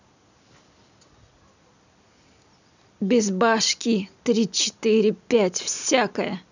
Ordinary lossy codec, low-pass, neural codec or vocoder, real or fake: none; 7.2 kHz; none; real